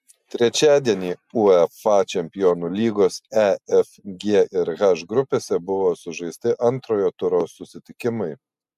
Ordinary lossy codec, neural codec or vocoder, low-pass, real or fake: MP3, 96 kbps; none; 14.4 kHz; real